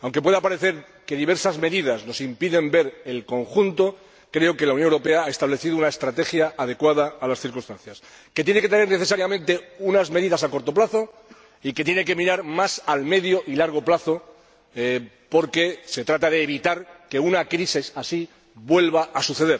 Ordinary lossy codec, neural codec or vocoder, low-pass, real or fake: none; none; none; real